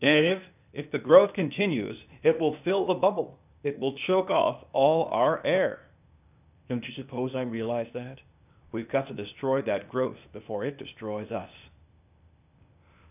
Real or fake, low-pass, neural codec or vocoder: fake; 3.6 kHz; codec, 16 kHz, 0.8 kbps, ZipCodec